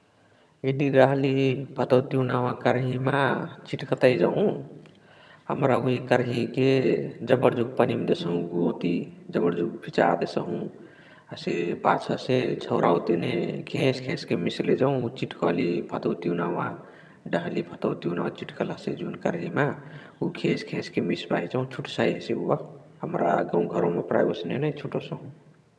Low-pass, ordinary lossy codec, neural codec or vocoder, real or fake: none; none; vocoder, 22.05 kHz, 80 mel bands, HiFi-GAN; fake